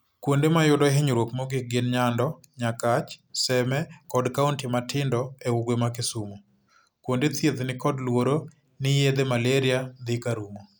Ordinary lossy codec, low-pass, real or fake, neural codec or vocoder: none; none; real; none